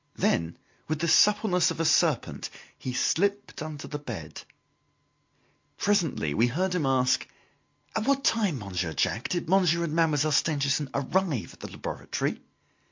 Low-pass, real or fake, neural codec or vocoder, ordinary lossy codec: 7.2 kHz; real; none; MP3, 48 kbps